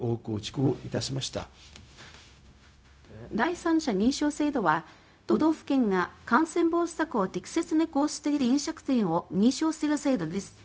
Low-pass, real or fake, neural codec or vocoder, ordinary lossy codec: none; fake; codec, 16 kHz, 0.4 kbps, LongCat-Audio-Codec; none